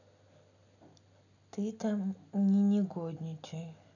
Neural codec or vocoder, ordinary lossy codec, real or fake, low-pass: none; none; real; 7.2 kHz